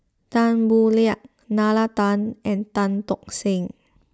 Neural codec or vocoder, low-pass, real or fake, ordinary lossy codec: none; none; real; none